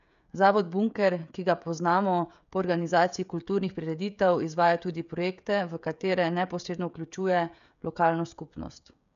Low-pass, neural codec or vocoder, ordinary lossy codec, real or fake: 7.2 kHz; codec, 16 kHz, 16 kbps, FreqCodec, smaller model; MP3, 96 kbps; fake